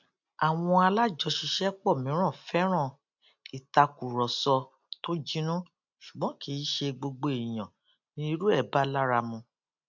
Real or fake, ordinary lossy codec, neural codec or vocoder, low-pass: real; none; none; 7.2 kHz